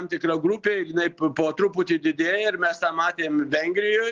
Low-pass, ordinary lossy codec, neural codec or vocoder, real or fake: 7.2 kHz; Opus, 24 kbps; none; real